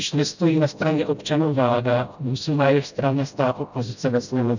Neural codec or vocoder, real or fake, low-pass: codec, 16 kHz, 0.5 kbps, FreqCodec, smaller model; fake; 7.2 kHz